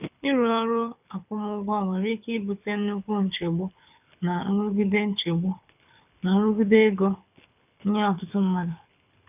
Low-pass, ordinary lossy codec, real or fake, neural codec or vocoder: 3.6 kHz; none; fake; codec, 24 kHz, 6 kbps, HILCodec